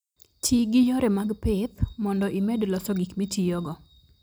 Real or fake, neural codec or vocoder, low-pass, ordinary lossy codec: real; none; none; none